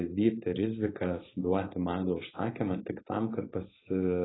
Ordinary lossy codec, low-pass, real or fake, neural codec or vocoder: AAC, 16 kbps; 7.2 kHz; fake; codec, 16 kHz, 4.8 kbps, FACodec